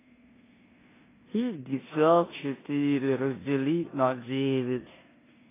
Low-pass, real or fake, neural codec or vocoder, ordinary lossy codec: 3.6 kHz; fake; codec, 16 kHz in and 24 kHz out, 0.9 kbps, LongCat-Audio-Codec, four codebook decoder; AAC, 16 kbps